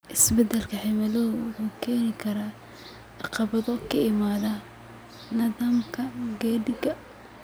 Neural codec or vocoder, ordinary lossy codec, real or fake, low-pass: none; none; real; none